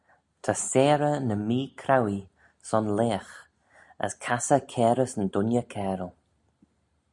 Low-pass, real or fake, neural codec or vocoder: 10.8 kHz; real; none